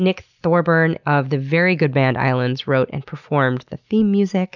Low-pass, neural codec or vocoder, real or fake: 7.2 kHz; none; real